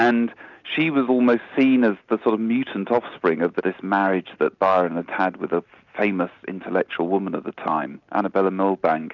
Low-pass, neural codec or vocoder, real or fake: 7.2 kHz; none; real